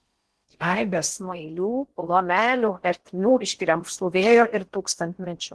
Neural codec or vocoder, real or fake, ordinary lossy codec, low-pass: codec, 16 kHz in and 24 kHz out, 0.6 kbps, FocalCodec, streaming, 4096 codes; fake; Opus, 16 kbps; 10.8 kHz